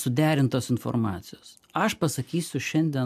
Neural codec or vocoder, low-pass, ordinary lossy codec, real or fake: none; 14.4 kHz; AAC, 96 kbps; real